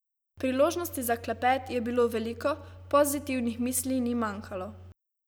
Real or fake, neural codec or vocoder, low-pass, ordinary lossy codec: real; none; none; none